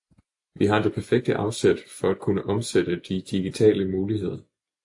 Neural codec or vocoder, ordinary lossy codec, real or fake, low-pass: none; AAC, 64 kbps; real; 10.8 kHz